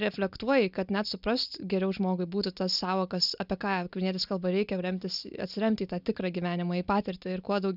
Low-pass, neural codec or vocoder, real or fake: 5.4 kHz; none; real